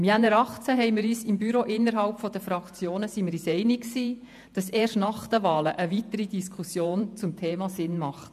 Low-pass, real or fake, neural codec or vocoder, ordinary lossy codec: 14.4 kHz; fake; vocoder, 48 kHz, 128 mel bands, Vocos; MP3, 96 kbps